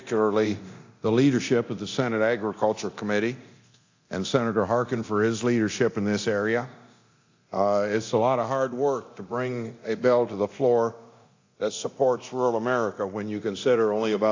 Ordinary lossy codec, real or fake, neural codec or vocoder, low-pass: AAC, 48 kbps; fake; codec, 24 kHz, 0.9 kbps, DualCodec; 7.2 kHz